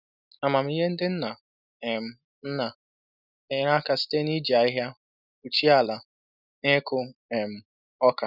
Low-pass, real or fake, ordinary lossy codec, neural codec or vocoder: 5.4 kHz; real; none; none